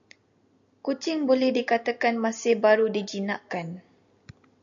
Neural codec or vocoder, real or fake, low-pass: none; real; 7.2 kHz